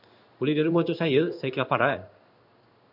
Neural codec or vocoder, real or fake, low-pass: codec, 16 kHz in and 24 kHz out, 1 kbps, XY-Tokenizer; fake; 5.4 kHz